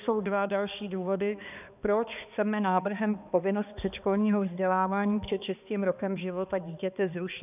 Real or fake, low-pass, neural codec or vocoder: fake; 3.6 kHz; codec, 16 kHz, 2 kbps, X-Codec, HuBERT features, trained on balanced general audio